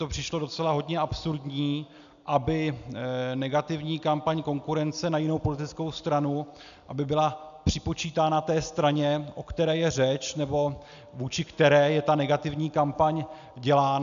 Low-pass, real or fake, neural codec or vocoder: 7.2 kHz; real; none